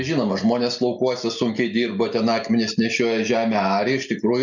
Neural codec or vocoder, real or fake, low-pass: none; real; 7.2 kHz